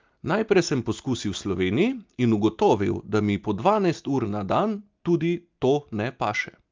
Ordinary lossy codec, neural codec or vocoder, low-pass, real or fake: Opus, 24 kbps; none; 7.2 kHz; real